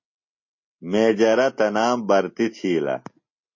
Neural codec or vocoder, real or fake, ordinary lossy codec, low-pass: none; real; MP3, 32 kbps; 7.2 kHz